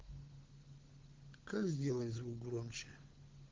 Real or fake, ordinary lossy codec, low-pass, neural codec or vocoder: fake; Opus, 16 kbps; 7.2 kHz; codec, 16 kHz, 16 kbps, FunCodec, trained on LibriTTS, 50 frames a second